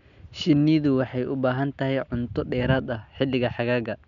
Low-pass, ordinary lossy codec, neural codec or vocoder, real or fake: 7.2 kHz; none; none; real